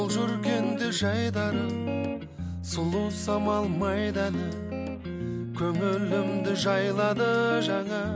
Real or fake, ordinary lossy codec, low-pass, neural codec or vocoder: real; none; none; none